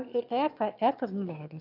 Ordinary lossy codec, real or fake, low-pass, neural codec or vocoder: none; fake; 5.4 kHz; autoencoder, 22.05 kHz, a latent of 192 numbers a frame, VITS, trained on one speaker